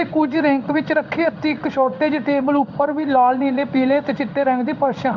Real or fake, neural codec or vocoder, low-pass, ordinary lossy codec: fake; codec, 16 kHz in and 24 kHz out, 1 kbps, XY-Tokenizer; 7.2 kHz; none